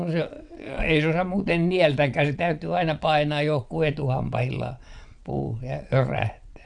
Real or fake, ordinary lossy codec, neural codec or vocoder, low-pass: fake; none; vocoder, 22.05 kHz, 80 mel bands, WaveNeXt; 9.9 kHz